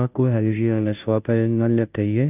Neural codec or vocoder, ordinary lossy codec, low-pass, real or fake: codec, 16 kHz, 0.5 kbps, FunCodec, trained on Chinese and English, 25 frames a second; none; 3.6 kHz; fake